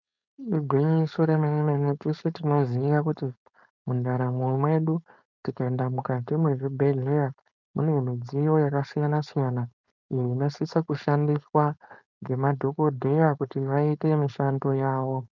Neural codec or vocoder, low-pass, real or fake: codec, 16 kHz, 4.8 kbps, FACodec; 7.2 kHz; fake